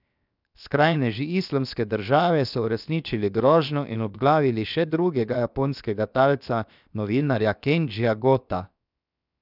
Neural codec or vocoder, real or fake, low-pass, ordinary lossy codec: codec, 16 kHz, 0.7 kbps, FocalCodec; fake; 5.4 kHz; none